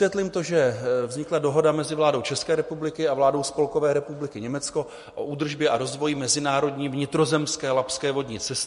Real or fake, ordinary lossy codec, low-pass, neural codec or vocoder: real; MP3, 48 kbps; 14.4 kHz; none